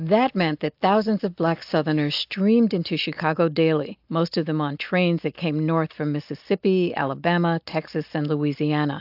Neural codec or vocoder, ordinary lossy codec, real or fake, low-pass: none; AAC, 48 kbps; real; 5.4 kHz